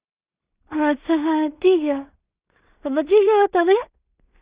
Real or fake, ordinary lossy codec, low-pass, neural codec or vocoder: fake; Opus, 64 kbps; 3.6 kHz; codec, 16 kHz in and 24 kHz out, 0.4 kbps, LongCat-Audio-Codec, two codebook decoder